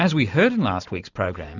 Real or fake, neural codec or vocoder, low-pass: real; none; 7.2 kHz